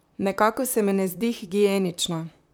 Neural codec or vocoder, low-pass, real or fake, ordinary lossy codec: vocoder, 44.1 kHz, 128 mel bands, Pupu-Vocoder; none; fake; none